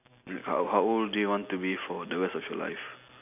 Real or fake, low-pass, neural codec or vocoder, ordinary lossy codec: real; 3.6 kHz; none; none